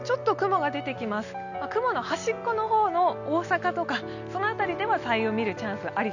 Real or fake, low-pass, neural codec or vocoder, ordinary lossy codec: real; 7.2 kHz; none; none